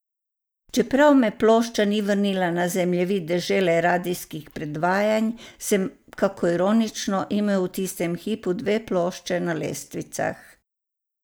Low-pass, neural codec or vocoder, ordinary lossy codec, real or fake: none; none; none; real